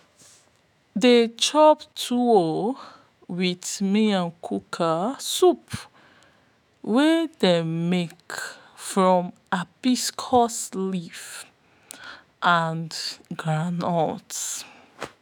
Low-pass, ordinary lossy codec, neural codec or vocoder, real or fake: none; none; autoencoder, 48 kHz, 128 numbers a frame, DAC-VAE, trained on Japanese speech; fake